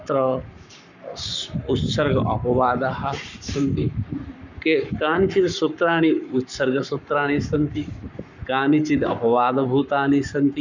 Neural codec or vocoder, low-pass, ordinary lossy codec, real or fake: codec, 44.1 kHz, 7.8 kbps, Pupu-Codec; 7.2 kHz; none; fake